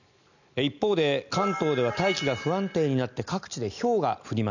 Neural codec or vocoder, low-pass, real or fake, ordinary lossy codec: none; 7.2 kHz; real; none